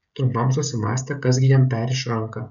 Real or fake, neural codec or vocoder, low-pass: fake; codec, 16 kHz, 16 kbps, FreqCodec, smaller model; 7.2 kHz